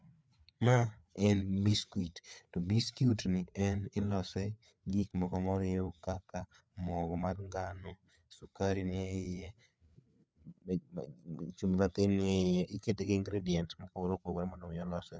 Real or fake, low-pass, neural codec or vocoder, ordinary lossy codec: fake; none; codec, 16 kHz, 4 kbps, FreqCodec, larger model; none